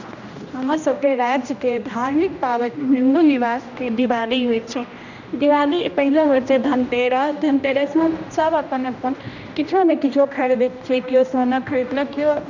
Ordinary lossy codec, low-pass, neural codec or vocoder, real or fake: none; 7.2 kHz; codec, 16 kHz, 1 kbps, X-Codec, HuBERT features, trained on general audio; fake